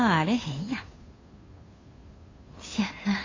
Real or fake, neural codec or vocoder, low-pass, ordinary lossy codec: fake; codec, 16 kHz, 2 kbps, FunCodec, trained on Chinese and English, 25 frames a second; 7.2 kHz; AAC, 32 kbps